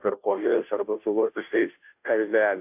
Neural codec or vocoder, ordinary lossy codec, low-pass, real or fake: codec, 16 kHz, 0.5 kbps, FunCodec, trained on Chinese and English, 25 frames a second; AAC, 32 kbps; 3.6 kHz; fake